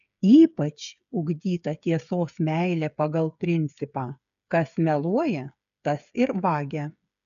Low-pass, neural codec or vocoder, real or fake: 7.2 kHz; codec, 16 kHz, 8 kbps, FreqCodec, smaller model; fake